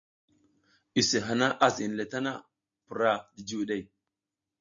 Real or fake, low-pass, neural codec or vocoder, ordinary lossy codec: real; 7.2 kHz; none; MP3, 48 kbps